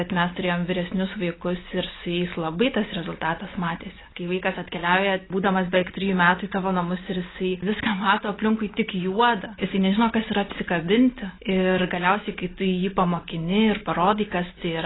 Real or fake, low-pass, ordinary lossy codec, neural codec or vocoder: real; 7.2 kHz; AAC, 16 kbps; none